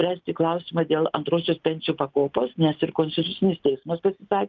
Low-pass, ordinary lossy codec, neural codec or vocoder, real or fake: 7.2 kHz; Opus, 32 kbps; none; real